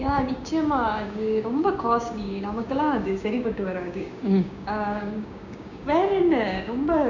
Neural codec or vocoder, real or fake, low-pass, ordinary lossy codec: codec, 16 kHz, 6 kbps, DAC; fake; 7.2 kHz; none